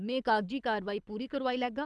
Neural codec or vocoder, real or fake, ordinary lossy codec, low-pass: codec, 24 kHz, 6 kbps, HILCodec; fake; none; none